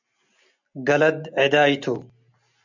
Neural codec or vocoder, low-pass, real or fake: none; 7.2 kHz; real